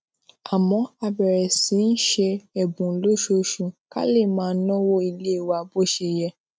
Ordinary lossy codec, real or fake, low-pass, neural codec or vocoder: none; real; none; none